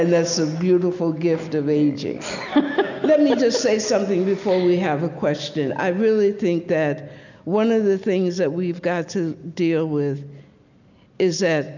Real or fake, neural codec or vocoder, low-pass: real; none; 7.2 kHz